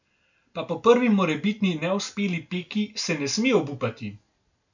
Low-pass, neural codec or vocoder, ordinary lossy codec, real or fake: 7.2 kHz; none; none; real